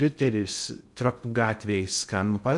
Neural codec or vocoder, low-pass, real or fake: codec, 16 kHz in and 24 kHz out, 0.6 kbps, FocalCodec, streaming, 2048 codes; 10.8 kHz; fake